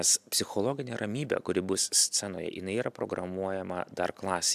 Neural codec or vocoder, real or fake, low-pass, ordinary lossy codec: none; real; 14.4 kHz; AAC, 96 kbps